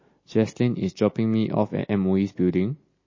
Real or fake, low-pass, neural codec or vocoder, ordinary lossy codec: real; 7.2 kHz; none; MP3, 32 kbps